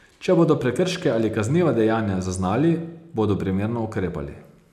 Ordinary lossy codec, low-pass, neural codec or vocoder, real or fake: none; 14.4 kHz; none; real